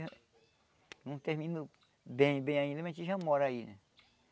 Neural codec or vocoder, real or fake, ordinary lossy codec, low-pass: none; real; none; none